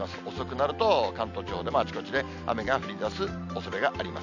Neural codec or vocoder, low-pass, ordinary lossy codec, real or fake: none; 7.2 kHz; none; real